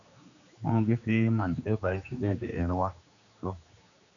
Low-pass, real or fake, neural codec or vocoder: 7.2 kHz; fake; codec, 16 kHz, 2 kbps, X-Codec, HuBERT features, trained on general audio